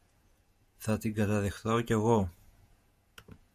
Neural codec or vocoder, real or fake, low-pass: vocoder, 48 kHz, 128 mel bands, Vocos; fake; 14.4 kHz